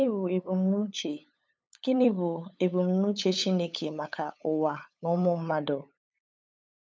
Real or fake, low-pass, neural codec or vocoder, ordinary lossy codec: fake; none; codec, 16 kHz, 8 kbps, FunCodec, trained on LibriTTS, 25 frames a second; none